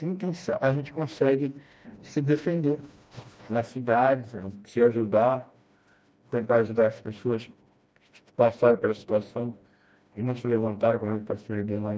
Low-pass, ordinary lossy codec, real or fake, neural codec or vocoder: none; none; fake; codec, 16 kHz, 1 kbps, FreqCodec, smaller model